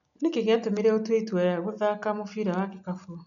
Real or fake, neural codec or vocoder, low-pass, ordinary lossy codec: real; none; 7.2 kHz; none